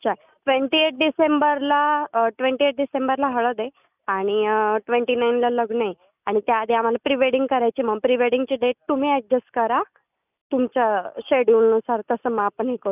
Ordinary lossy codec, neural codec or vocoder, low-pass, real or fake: none; none; 3.6 kHz; real